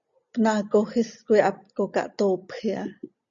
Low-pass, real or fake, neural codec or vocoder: 7.2 kHz; real; none